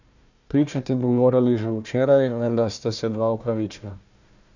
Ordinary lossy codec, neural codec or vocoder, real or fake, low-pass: none; codec, 16 kHz, 1 kbps, FunCodec, trained on Chinese and English, 50 frames a second; fake; 7.2 kHz